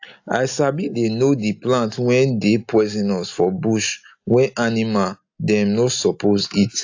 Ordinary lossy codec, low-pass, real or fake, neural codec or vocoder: AAC, 48 kbps; 7.2 kHz; real; none